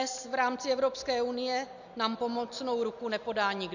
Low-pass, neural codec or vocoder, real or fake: 7.2 kHz; none; real